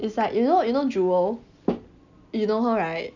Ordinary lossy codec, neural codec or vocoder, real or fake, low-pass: none; none; real; 7.2 kHz